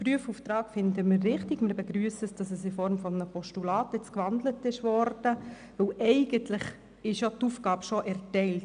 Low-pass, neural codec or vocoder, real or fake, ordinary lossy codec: 9.9 kHz; none; real; none